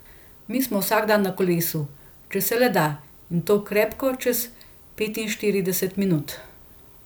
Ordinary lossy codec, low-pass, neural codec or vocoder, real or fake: none; none; none; real